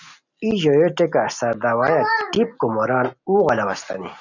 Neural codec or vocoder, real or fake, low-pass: none; real; 7.2 kHz